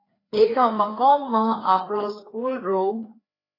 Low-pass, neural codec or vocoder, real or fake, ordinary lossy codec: 5.4 kHz; codec, 16 kHz, 2 kbps, FreqCodec, larger model; fake; AAC, 24 kbps